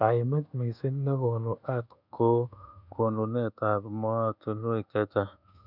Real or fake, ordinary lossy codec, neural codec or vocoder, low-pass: fake; none; codec, 24 kHz, 1.2 kbps, DualCodec; 5.4 kHz